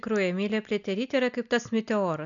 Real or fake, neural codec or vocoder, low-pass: real; none; 7.2 kHz